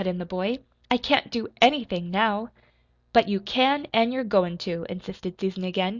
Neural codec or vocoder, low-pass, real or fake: none; 7.2 kHz; real